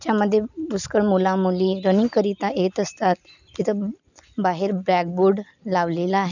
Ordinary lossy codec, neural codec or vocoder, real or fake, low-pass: none; none; real; 7.2 kHz